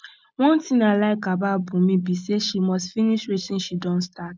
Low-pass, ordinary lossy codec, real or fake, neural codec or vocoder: none; none; real; none